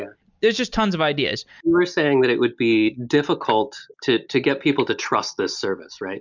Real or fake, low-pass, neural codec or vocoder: real; 7.2 kHz; none